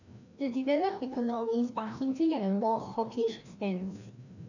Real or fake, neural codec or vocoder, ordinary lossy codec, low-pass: fake; codec, 16 kHz, 1 kbps, FreqCodec, larger model; none; 7.2 kHz